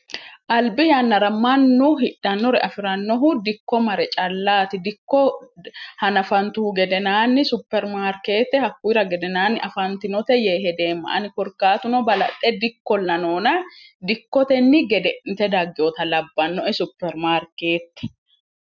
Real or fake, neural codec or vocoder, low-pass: real; none; 7.2 kHz